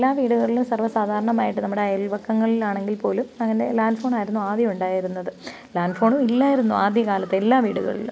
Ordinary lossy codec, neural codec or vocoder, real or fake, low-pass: none; none; real; none